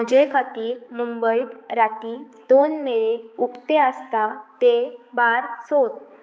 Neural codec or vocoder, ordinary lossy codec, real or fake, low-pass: codec, 16 kHz, 4 kbps, X-Codec, HuBERT features, trained on balanced general audio; none; fake; none